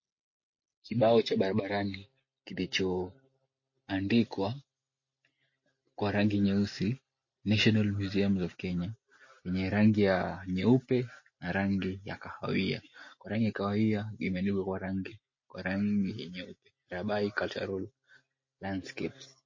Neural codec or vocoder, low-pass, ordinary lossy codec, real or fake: none; 7.2 kHz; MP3, 32 kbps; real